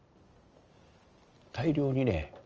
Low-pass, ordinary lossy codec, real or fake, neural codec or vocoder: 7.2 kHz; Opus, 24 kbps; real; none